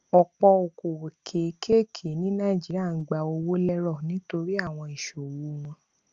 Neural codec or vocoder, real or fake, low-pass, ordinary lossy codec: none; real; 7.2 kHz; Opus, 32 kbps